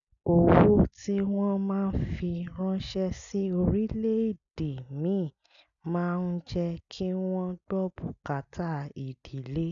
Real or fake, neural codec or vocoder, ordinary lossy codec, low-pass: real; none; none; 7.2 kHz